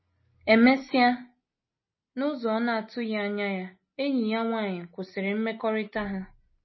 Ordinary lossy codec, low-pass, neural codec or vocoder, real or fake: MP3, 24 kbps; 7.2 kHz; none; real